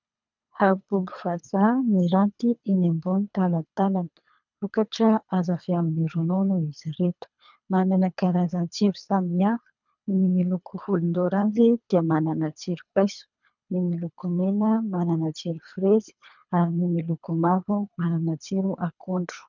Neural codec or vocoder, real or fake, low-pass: codec, 24 kHz, 3 kbps, HILCodec; fake; 7.2 kHz